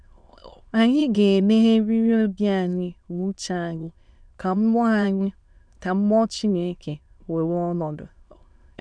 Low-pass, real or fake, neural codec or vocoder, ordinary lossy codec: 9.9 kHz; fake; autoencoder, 22.05 kHz, a latent of 192 numbers a frame, VITS, trained on many speakers; none